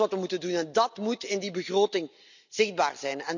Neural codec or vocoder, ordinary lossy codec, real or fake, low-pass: none; none; real; 7.2 kHz